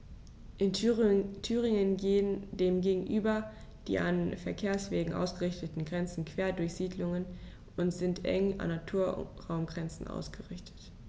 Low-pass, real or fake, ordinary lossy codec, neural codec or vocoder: none; real; none; none